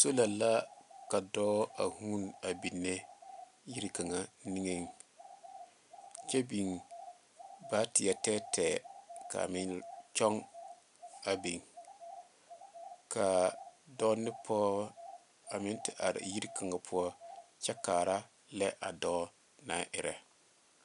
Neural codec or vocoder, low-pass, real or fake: none; 10.8 kHz; real